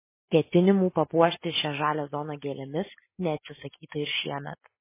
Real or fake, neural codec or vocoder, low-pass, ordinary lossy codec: real; none; 3.6 kHz; MP3, 16 kbps